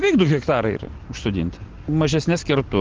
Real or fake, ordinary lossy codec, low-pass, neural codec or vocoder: real; Opus, 16 kbps; 7.2 kHz; none